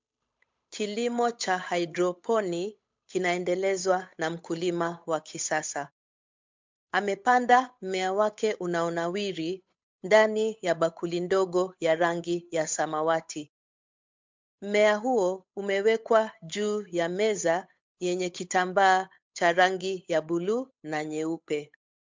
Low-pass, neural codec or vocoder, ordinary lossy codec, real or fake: 7.2 kHz; codec, 16 kHz, 8 kbps, FunCodec, trained on Chinese and English, 25 frames a second; MP3, 64 kbps; fake